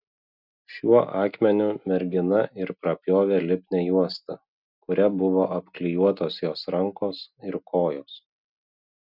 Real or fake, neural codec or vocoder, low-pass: real; none; 5.4 kHz